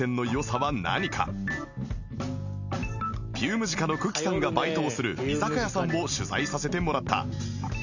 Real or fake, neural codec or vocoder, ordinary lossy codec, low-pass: real; none; none; 7.2 kHz